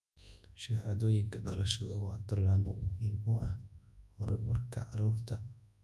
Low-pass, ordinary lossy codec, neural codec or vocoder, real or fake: none; none; codec, 24 kHz, 0.9 kbps, WavTokenizer, large speech release; fake